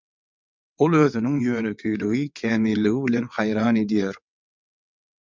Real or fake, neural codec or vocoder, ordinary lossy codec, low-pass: fake; vocoder, 22.05 kHz, 80 mel bands, WaveNeXt; MP3, 64 kbps; 7.2 kHz